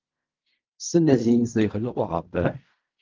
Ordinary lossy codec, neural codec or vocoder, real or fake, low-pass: Opus, 24 kbps; codec, 16 kHz in and 24 kHz out, 0.4 kbps, LongCat-Audio-Codec, fine tuned four codebook decoder; fake; 7.2 kHz